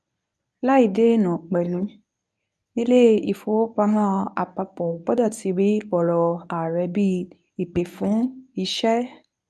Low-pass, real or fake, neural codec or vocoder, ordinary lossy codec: none; fake; codec, 24 kHz, 0.9 kbps, WavTokenizer, medium speech release version 1; none